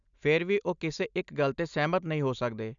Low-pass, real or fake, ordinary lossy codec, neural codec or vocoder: 7.2 kHz; real; none; none